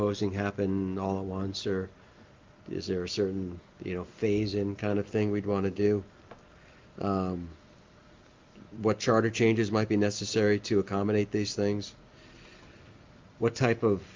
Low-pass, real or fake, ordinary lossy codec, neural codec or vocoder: 7.2 kHz; real; Opus, 24 kbps; none